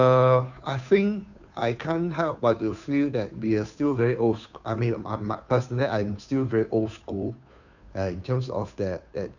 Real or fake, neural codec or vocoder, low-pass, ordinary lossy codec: fake; codec, 16 kHz, 2 kbps, FunCodec, trained on Chinese and English, 25 frames a second; 7.2 kHz; none